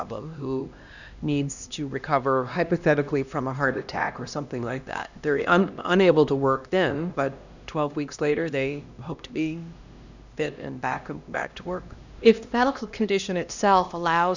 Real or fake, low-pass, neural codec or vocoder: fake; 7.2 kHz; codec, 16 kHz, 1 kbps, X-Codec, HuBERT features, trained on LibriSpeech